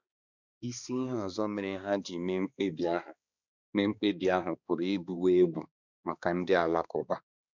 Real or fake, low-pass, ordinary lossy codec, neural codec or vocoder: fake; 7.2 kHz; none; codec, 16 kHz, 2 kbps, X-Codec, HuBERT features, trained on balanced general audio